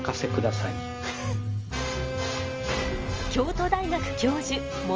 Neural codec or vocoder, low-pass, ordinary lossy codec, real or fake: none; 7.2 kHz; Opus, 24 kbps; real